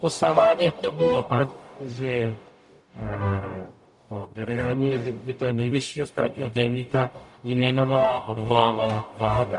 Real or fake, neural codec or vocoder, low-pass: fake; codec, 44.1 kHz, 0.9 kbps, DAC; 10.8 kHz